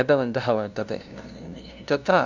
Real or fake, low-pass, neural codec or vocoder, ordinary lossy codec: fake; 7.2 kHz; codec, 16 kHz, 0.5 kbps, FunCodec, trained on LibriTTS, 25 frames a second; none